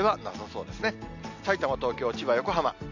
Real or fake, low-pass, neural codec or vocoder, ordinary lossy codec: real; 7.2 kHz; none; MP3, 64 kbps